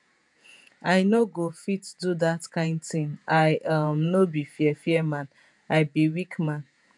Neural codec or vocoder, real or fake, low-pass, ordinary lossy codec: vocoder, 48 kHz, 128 mel bands, Vocos; fake; 10.8 kHz; none